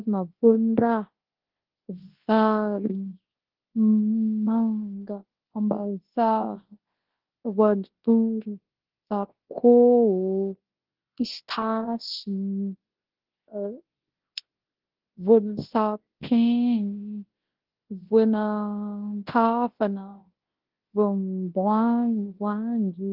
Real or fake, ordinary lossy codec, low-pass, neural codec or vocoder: fake; Opus, 16 kbps; 5.4 kHz; codec, 24 kHz, 0.9 kbps, WavTokenizer, large speech release